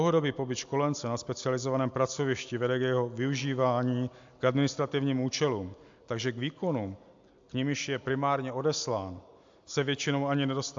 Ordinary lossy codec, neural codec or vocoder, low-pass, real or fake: AAC, 64 kbps; none; 7.2 kHz; real